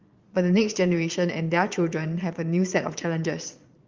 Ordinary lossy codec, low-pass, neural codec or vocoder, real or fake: Opus, 32 kbps; 7.2 kHz; vocoder, 22.05 kHz, 80 mel bands, Vocos; fake